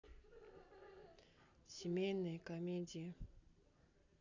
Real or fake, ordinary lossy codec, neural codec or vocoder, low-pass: real; none; none; 7.2 kHz